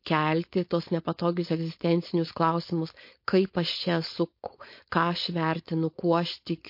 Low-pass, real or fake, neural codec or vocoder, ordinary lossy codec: 5.4 kHz; fake; codec, 16 kHz, 4.8 kbps, FACodec; MP3, 32 kbps